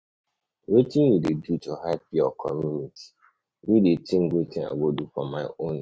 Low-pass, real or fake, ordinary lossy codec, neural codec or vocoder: none; real; none; none